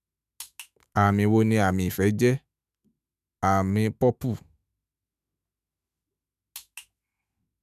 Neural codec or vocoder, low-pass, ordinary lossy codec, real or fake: autoencoder, 48 kHz, 128 numbers a frame, DAC-VAE, trained on Japanese speech; 14.4 kHz; none; fake